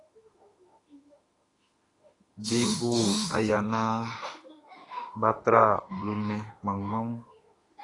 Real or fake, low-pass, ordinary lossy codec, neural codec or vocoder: fake; 10.8 kHz; AAC, 32 kbps; autoencoder, 48 kHz, 32 numbers a frame, DAC-VAE, trained on Japanese speech